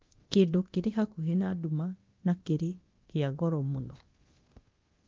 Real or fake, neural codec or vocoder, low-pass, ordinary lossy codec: fake; codec, 24 kHz, 0.9 kbps, DualCodec; 7.2 kHz; Opus, 16 kbps